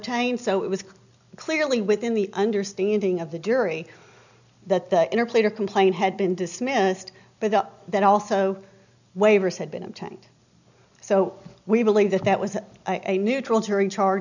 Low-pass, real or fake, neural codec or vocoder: 7.2 kHz; real; none